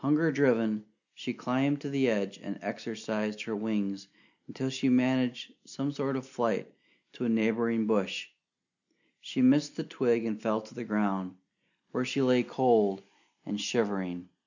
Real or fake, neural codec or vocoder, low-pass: real; none; 7.2 kHz